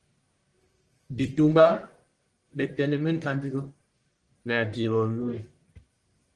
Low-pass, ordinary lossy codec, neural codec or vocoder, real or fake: 10.8 kHz; Opus, 24 kbps; codec, 44.1 kHz, 1.7 kbps, Pupu-Codec; fake